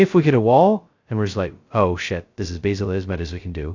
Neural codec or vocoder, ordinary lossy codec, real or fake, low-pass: codec, 16 kHz, 0.2 kbps, FocalCodec; AAC, 48 kbps; fake; 7.2 kHz